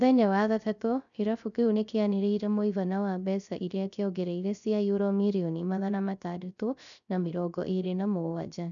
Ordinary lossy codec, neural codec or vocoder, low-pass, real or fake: MP3, 96 kbps; codec, 16 kHz, 0.3 kbps, FocalCodec; 7.2 kHz; fake